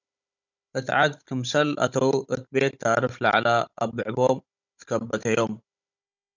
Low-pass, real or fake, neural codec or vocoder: 7.2 kHz; fake; codec, 16 kHz, 16 kbps, FunCodec, trained on Chinese and English, 50 frames a second